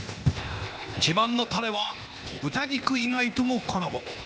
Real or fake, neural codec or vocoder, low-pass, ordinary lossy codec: fake; codec, 16 kHz, 0.8 kbps, ZipCodec; none; none